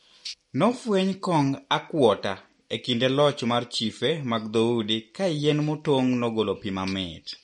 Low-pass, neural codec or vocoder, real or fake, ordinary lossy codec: 10.8 kHz; none; real; MP3, 48 kbps